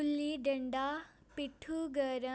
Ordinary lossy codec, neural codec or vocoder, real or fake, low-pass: none; none; real; none